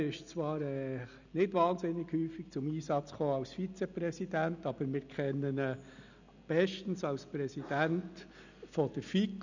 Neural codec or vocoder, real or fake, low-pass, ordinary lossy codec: none; real; 7.2 kHz; none